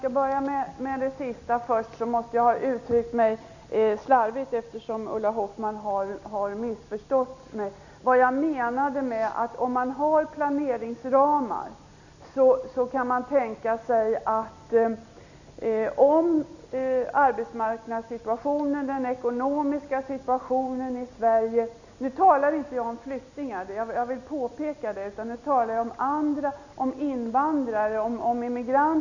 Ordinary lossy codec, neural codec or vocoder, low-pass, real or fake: none; none; 7.2 kHz; real